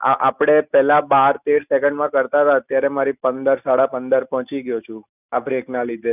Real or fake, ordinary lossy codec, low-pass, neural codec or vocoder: real; none; 3.6 kHz; none